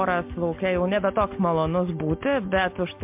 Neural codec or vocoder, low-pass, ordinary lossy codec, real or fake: none; 3.6 kHz; MP3, 32 kbps; real